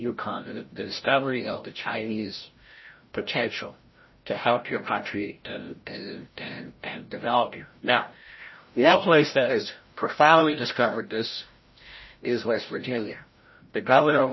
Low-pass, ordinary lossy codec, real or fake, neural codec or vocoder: 7.2 kHz; MP3, 24 kbps; fake; codec, 16 kHz, 0.5 kbps, FreqCodec, larger model